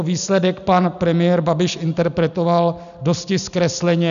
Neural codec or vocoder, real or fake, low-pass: none; real; 7.2 kHz